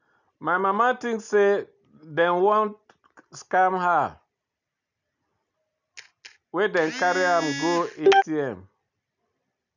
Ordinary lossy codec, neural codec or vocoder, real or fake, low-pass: none; none; real; 7.2 kHz